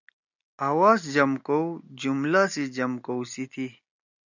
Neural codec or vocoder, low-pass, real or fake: none; 7.2 kHz; real